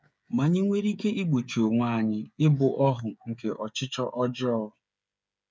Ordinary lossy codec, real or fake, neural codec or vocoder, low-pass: none; fake; codec, 16 kHz, 8 kbps, FreqCodec, smaller model; none